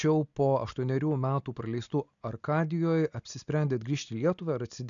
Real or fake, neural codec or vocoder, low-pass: real; none; 7.2 kHz